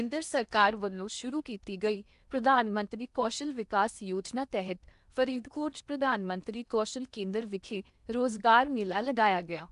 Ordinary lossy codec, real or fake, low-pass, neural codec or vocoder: none; fake; 10.8 kHz; codec, 16 kHz in and 24 kHz out, 0.8 kbps, FocalCodec, streaming, 65536 codes